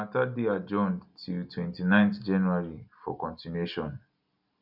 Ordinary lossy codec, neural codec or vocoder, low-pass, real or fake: none; none; 5.4 kHz; real